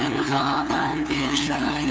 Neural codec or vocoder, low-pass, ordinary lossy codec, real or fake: codec, 16 kHz, 2 kbps, FunCodec, trained on LibriTTS, 25 frames a second; none; none; fake